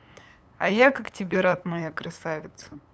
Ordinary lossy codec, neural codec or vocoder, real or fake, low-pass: none; codec, 16 kHz, 8 kbps, FunCodec, trained on LibriTTS, 25 frames a second; fake; none